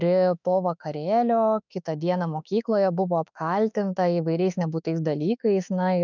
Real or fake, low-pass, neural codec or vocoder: fake; 7.2 kHz; autoencoder, 48 kHz, 32 numbers a frame, DAC-VAE, trained on Japanese speech